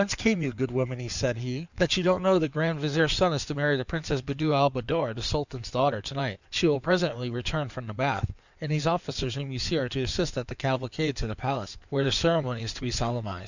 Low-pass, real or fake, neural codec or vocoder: 7.2 kHz; fake; codec, 16 kHz in and 24 kHz out, 2.2 kbps, FireRedTTS-2 codec